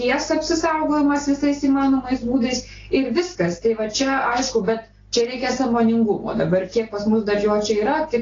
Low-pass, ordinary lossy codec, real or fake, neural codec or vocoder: 7.2 kHz; AAC, 32 kbps; real; none